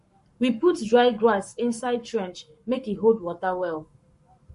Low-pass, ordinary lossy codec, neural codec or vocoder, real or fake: 14.4 kHz; MP3, 48 kbps; codec, 44.1 kHz, 7.8 kbps, Pupu-Codec; fake